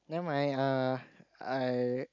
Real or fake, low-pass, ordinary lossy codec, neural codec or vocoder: real; 7.2 kHz; none; none